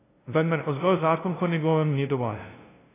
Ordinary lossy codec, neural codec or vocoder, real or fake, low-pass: AAC, 16 kbps; codec, 16 kHz, 0.5 kbps, FunCodec, trained on LibriTTS, 25 frames a second; fake; 3.6 kHz